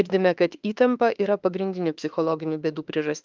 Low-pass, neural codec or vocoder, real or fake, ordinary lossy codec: 7.2 kHz; autoencoder, 48 kHz, 32 numbers a frame, DAC-VAE, trained on Japanese speech; fake; Opus, 24 kbps